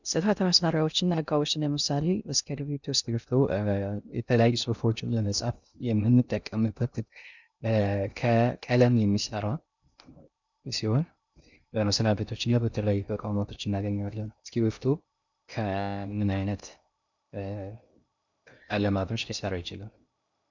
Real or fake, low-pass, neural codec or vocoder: fake; 7.2 kHz; codec, 16 kHz in and 24 kHz out, 0.6 kbps, FocalCodec, streaming, 2048 codes